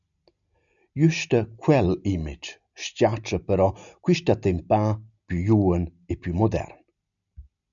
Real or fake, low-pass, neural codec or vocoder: real; 7.2 kHz; none